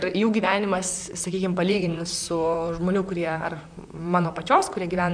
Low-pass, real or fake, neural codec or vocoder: 9.9 kHz; fake; vocoder, 44.1 kHz, 128 mel bands, Pupu-Vocoder